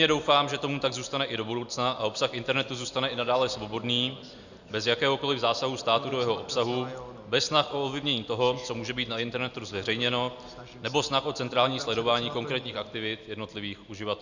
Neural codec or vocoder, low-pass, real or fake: none; 7.2 kHz; real